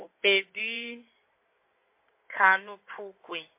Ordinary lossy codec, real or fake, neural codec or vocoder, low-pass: MP3, 24 kbps; fake; codec, 16 kHz in and 24 kHz out, 2.2 kbps, FireRedTTS-2 codec; 3.6 kHz